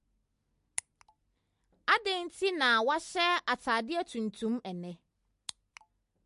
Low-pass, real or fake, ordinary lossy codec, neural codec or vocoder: 14.4 kHz; real; MP3, 48 kbps; none